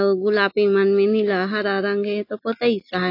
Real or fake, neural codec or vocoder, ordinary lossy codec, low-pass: real; none; AAC, 32 kbps; 5.4 kHz